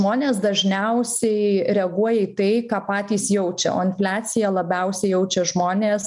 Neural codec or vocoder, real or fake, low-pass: none; real; 10.8 kHz